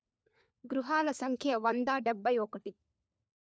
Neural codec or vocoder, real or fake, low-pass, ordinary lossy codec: codec, 16 kHz, 4 kbps, FunCodec, trained on LibriTTS, 50 frames a second; fake; none; none